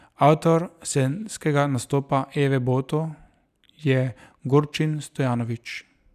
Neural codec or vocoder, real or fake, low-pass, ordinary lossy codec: none; real; 14.4 kHz; none